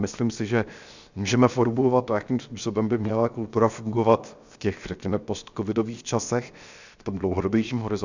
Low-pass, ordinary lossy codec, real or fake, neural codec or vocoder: 7.2 kHz; Opus, 64 kbps; fake; codec, 16 kHz, 0.7 kbps, FocalCodec